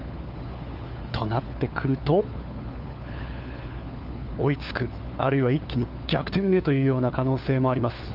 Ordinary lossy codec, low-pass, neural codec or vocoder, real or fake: Opus, 24 kbps; 5.4 kHz; codec, 16 kHz, 4 kbps, FunCodec, trained on LibriTTS, 50 frames a second; fake